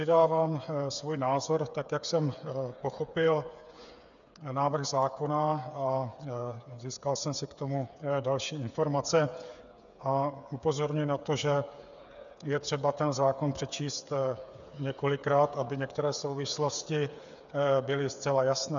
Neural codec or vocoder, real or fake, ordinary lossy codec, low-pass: codec, 16 kHz, 8 kbps, FreqCodec, smaller model; fake; MP3, 96 kbps; 7.2 kHz